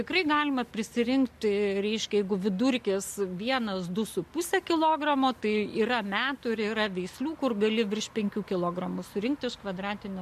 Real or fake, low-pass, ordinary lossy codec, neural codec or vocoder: real; 14.4 kHz; MP3, 64 kbps; none